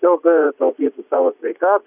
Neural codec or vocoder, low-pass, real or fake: vocoder, 44.1 kHz, 80 mel bands, Vocos; 3.6 kHz; fake